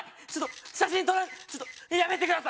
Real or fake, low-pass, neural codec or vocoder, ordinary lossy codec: real; none; none; none